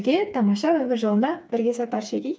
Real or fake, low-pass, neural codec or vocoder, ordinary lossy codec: fake; none; codec, 16 kHz, 4 kbps, FreqCodec, smaller model; none